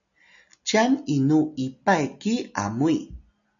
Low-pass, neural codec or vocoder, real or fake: 7.2 kHz; none; real